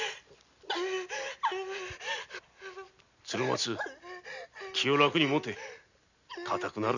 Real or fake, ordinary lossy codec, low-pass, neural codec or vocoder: real; none; 7.2 kHz; none